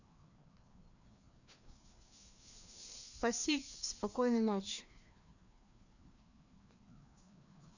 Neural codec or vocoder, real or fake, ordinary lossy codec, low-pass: codec, 16 kHz, 2 kbps, FreqCodec, larger model; fake; none; 7.2 kHz